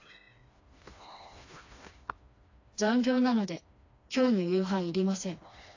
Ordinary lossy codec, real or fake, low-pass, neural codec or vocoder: AAC, 48 kbps; fake; 7.2 kHz; codec, 16 kHz, 2 kbps, FreqCodec, smaller model